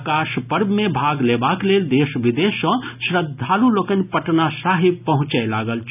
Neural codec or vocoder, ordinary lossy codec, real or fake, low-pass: none; none; real; 3.6 kHz